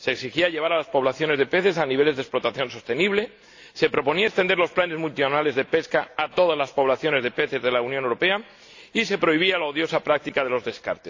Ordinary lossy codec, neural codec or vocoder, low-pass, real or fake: AAC, 48 kbps; none; 7.2 kHz; real